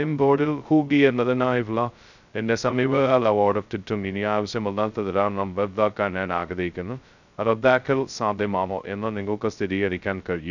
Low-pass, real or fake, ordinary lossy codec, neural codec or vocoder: 7.2 kHz; fake; none; codec, 16 kHz, 0.2 kbps, FocalCodec